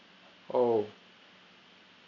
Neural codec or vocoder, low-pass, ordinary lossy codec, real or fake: none; 7.2 kHz; none; real